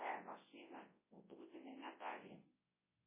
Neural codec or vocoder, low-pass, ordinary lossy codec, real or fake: codec, 24 kHz, 0.9 kbps, WavTokenizer, large speech release; 3.6 kHz; MP3, 16 kbps; fake